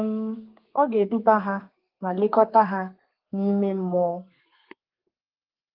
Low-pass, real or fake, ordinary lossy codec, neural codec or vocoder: 5.4 kHz; fake; Opus, 24 kbps; codec, 32 kHz, 1.9 kbps, SNAC